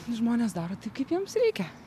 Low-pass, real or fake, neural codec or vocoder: 14.4 kHz; real; none